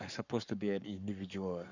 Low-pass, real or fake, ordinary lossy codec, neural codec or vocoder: 7.2 kHz; fake; none; codec, 44.1 kHz, 7.8 kbps, Pupu-Codec